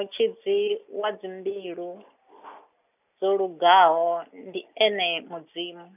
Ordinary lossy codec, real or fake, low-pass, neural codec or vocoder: none; real; 3.6 kHz; none